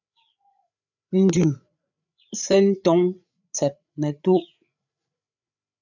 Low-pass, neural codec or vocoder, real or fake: 7.2 kHz; codec, 16 kHz, 8 kbps, FreqCodec, larger model; fake